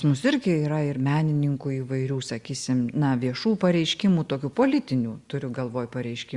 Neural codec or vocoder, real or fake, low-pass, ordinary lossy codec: none; real; 10.8 kHz; Opus, 64 kbps